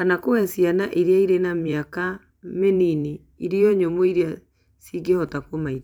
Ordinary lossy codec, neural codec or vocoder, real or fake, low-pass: none; vocoder, 44.1 kHz, 128 mel bands every 512 samples, BigVGAN v2; fake; 19.8 kHz